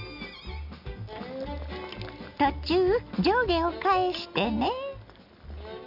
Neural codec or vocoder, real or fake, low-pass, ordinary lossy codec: none; real; 5.4 kHz; none